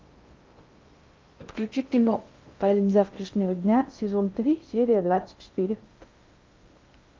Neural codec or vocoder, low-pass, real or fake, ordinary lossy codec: codec, 16 kHz in and 24 kHz out, 0.6 kbps, FocalCodec, streaming, 2048 codes; 7.2 kHz; fake; Opus, 24 kbps